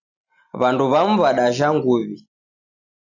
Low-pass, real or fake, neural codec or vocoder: 7.2 kHz; real; none